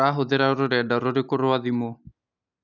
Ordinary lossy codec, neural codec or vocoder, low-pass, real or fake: none; none; 7.2 kHz; real